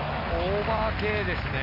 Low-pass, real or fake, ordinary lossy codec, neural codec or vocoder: 5.4 kHz; real; MP3, 48 kbps; none